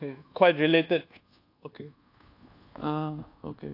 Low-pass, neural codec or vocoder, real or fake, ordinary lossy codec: 5.4 kHz; codec, 24 kHz, 1.2 kbps, DualCodec; fake; AAC, 32 kbps